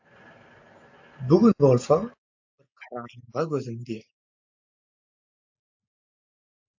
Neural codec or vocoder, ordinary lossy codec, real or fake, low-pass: none; AAC, 48 kbps; real; 7.2 kHz